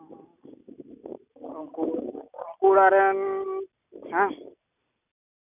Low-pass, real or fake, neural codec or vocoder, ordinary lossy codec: 3.6 kHz; real; none; none